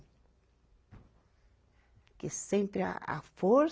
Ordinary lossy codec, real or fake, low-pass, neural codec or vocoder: none; real; none; none